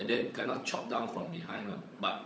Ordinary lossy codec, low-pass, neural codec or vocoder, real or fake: none; none; codec, 16 kHz, 16 kbps, FunCodec, trained on LibriTTS, 50 frames a second; fake